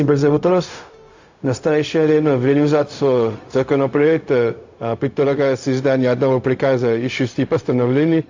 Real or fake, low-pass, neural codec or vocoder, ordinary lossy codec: fake; 7.2 kHz; codec, 16 kHz, 0.4 kbps, LongCat-Audio-Codec; AAC, 48 kbps